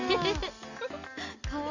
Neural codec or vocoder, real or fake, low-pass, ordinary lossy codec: none; real; 7.2 kHz; none